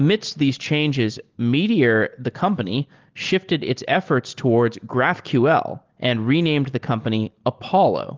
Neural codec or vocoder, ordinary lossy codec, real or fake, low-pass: none; Opus, 16 kbps; real; 7.2 kHz